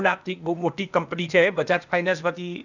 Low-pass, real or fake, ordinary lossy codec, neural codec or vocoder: 7.2 kHz; fake; none; codec, 16 kHz, 0.8 kbps, ZipCodec